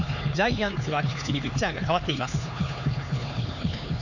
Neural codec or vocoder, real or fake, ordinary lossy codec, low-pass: codec, 16 kHz, 4 kbps, X-Codec, HuBERT features, trained on LibriSpeech; fake; none; 7.2 kHz